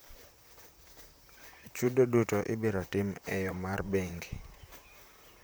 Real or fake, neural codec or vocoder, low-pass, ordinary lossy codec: fake; vocoder, 44.1 kHz, 128 mel bands, Pupu-Vocoder; none; none